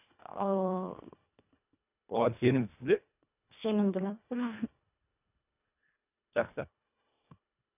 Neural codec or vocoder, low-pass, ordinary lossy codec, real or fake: codec, 24 kHz, 1.5 kbps, HILCodec; 3.6 kHz; none; fake